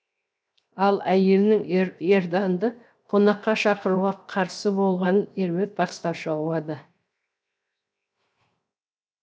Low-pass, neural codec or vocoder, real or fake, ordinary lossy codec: none; codec, 16 kHz, 0.7 kbps, FocalCodec; fake; none